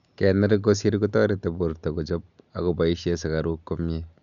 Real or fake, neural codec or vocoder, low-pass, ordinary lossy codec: real; none; 7.2 kHz; none